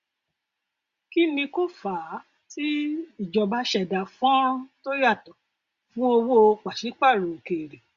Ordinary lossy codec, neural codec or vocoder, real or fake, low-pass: Opus, 64 kbps; none; real; 7.2 kHz